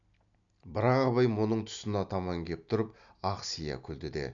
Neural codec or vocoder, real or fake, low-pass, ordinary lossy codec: none; real; 7.2 kHz; none